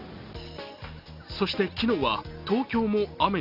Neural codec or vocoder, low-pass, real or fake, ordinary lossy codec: none; 5.4 kHz; real; none